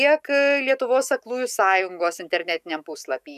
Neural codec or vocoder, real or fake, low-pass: autoencoder, 48 kHz, 128 numbers a frame, DAC-VAE, trained on Japanese speech; fake; 14.4 kHz